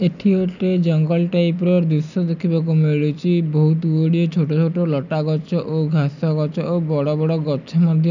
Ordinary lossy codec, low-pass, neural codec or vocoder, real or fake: none; 7.2 kHz; none; real